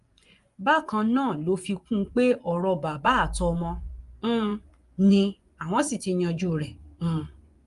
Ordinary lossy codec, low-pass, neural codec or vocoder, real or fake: Opus, 32 kbps; 10.8 kHz; vocoder, 24 kHz, 100 mel bands, Vocos; fake